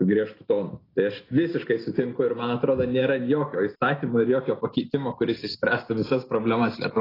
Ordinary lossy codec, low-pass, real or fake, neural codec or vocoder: AAC, 24 kbps; 5.4 kHz; real; none